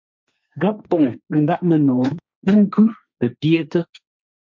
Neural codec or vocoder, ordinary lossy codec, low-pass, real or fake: codec, 16 kHz, 1.1 kbps, Voila-Tokenizer; MP3, 64 kbps; 7.2 kHz; fake